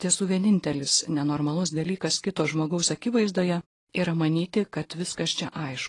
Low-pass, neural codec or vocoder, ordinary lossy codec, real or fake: 10.8 kHz; none; AAC, 32 kbps; real